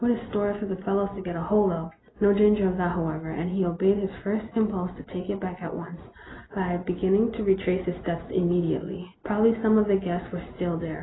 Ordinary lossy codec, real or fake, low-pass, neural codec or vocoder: AAC, 16 kbps; real; 7.2 kHz; none